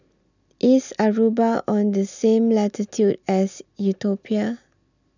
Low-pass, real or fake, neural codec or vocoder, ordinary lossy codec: 7.2 kHz; real; none; none